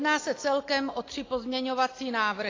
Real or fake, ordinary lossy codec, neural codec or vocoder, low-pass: real; AAC, 32 kbps; none; 7.2 kHz